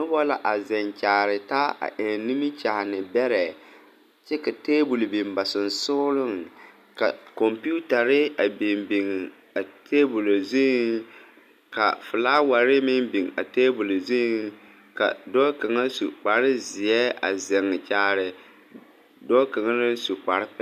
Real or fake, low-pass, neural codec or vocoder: real; 14.4 kHz; none